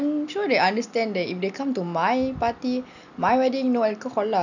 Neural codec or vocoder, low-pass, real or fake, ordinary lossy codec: none; 7.2 kHz; real; none